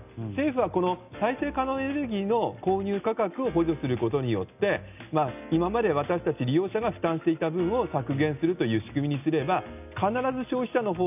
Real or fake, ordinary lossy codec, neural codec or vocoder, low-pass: real; none; none; 3.6 kHz